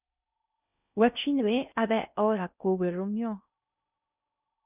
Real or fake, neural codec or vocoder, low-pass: fake; codec, 16 kHz in and 24 kHz out, 0.6 kbps, FocalCodec, streaming, 4096 codes; 3.6 kHz